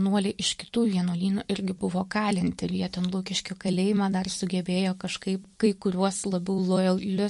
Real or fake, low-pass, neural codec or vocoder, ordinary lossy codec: fake; 14.4 kHz; vocoder, 44.1 kHz, 128 mel bands every 256 samples, BigVGAN v2; MP3, 48 kbps